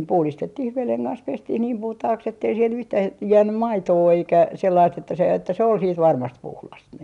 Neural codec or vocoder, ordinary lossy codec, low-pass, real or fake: none; none; 10.8 kHz; real